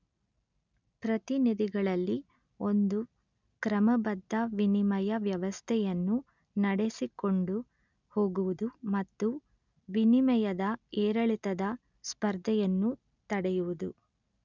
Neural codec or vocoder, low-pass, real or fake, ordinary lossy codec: none; 7.2 kHz; real; none